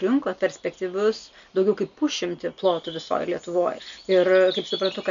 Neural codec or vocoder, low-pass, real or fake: none; 7.2 kHz; real